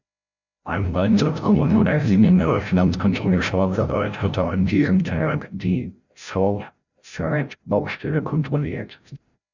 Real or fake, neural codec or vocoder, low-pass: fake; codec, 16 kHz, 0.5 kbps, FreqCodec, larger model; 7.2 kHz